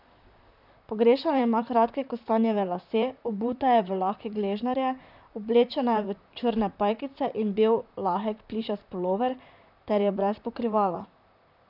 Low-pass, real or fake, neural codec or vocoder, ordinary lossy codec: 5.4 kHz; fake; vocoder, 44.1 kHz, 128 mel bands, Pupu-Vocoder; none